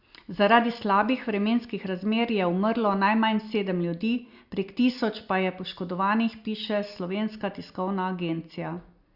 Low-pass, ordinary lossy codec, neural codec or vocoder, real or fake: 5.4 kHz; Opus, 64 kbps; none; real